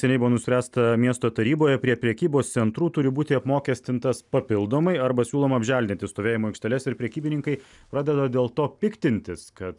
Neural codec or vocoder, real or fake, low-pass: none; real; 10.8 kHz